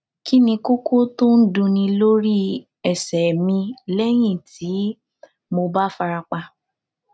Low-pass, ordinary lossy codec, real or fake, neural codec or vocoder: none; none; real; none